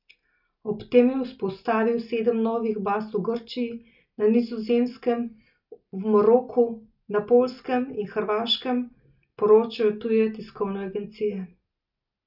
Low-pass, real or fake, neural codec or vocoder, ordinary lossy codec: 5.4 kHz; real; none; none